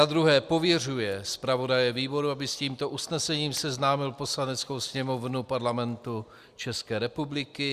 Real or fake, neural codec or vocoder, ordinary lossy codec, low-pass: real; none; Opus, 64 kbps; 14.4 kHz